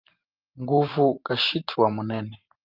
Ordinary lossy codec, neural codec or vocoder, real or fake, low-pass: Opus, 24 kbps; vocoder, 44.1 kHz, 128 mel bands every 512 samples, BigVGAN v2; fake; 5.4 kHz